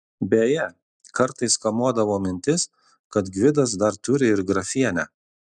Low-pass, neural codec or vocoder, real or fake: 10.8 kHz; none; real